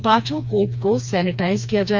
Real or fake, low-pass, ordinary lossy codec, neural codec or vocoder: fake; none; none; codec, 16 kHz, 2 kbps, FreqCodec, smaller model